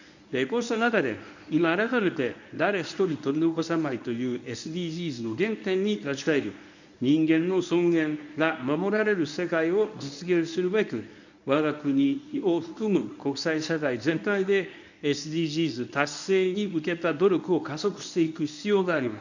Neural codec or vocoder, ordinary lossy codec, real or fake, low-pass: codec, 24 kHz, 0.9 kbps, WavTokenizer, medium speech release version 1; none; fake; 7.2 kHz